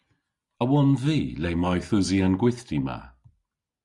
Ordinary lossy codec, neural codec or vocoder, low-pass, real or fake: Opus, 64 kbps; none; 10.8 kHz; real